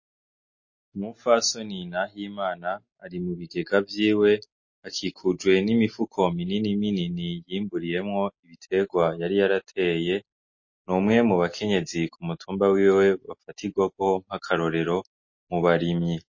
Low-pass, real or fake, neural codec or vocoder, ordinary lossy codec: 7.2 kHz; real; none; MP3, 32 kbps